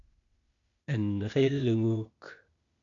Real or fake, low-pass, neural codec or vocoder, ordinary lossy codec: fake; 7.2 kHz; codec, 16 kHz, 0.8 kbps, ZipCodec; AAC, 64 kbps